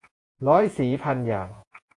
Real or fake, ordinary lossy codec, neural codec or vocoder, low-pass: fake; AAC, 48 kbps; vocoder, 48 kHz, 128 mel bands, Vocos; 10.8 kHz